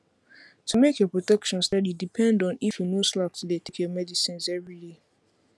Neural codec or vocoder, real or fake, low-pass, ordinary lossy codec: none; real; none; none